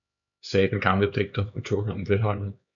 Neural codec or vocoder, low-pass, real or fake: codec, 16 kHz, 4 kbps, X-Codec, HuBERT features, trained on LibriSpeech; 7.2 kHz; fake